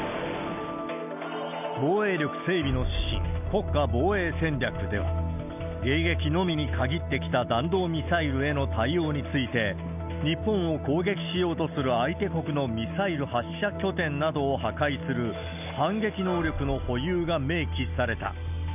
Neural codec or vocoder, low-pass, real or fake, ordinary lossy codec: none; 3.6 kHz; real; none